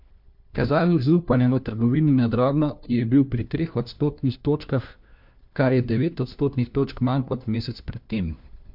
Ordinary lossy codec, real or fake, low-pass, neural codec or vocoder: MP3, 48 kbps; fake; 5.4 kHz; codec, 16 kHz, 1 kbps, FunCodec, trained on LibriTTS, 50 frames a second